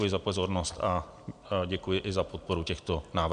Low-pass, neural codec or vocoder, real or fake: 9.9 kHz; none; real